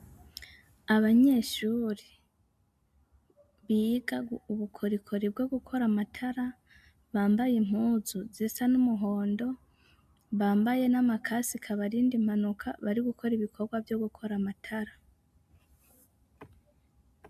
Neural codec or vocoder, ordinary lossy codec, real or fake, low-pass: none; MP3, 96 kbps; real; 14.4 kHz